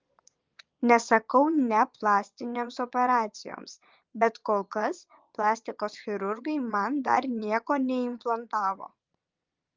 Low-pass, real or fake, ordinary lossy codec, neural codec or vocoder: 7.2 kHz; fake; Opus, 24 kbps; vocoder, 22.05 kHz, 80 mel bands, Vocos